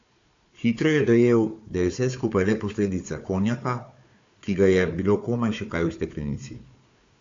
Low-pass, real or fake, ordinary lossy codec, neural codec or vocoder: 7.2 kHz; fake; AAC, 48 kbps; codec, 16 kHz, 4 kbps, FunCodec, trained on Chinese and English, 50 frames a second